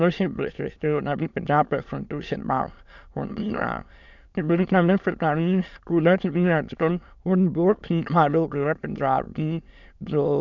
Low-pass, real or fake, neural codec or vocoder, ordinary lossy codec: 7.2 kHz; fake; autoencoder, 22.05 kHz, a latent of 192 numbers a frame, VITS, trained on many speakers; none